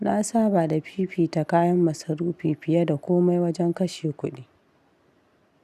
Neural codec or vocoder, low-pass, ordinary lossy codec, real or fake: none; 14.4 kHz; none; real